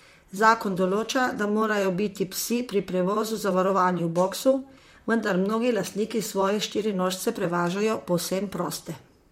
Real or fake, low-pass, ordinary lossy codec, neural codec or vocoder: fake; 19.8 kHz; MP3, 64 kbps; vocoder, 44.1 kHz, 128 mel bands, Pupu-Vocoder